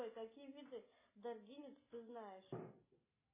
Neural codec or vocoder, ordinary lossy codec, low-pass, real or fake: none; MP3, 16 kbps; 3.6 kHz; real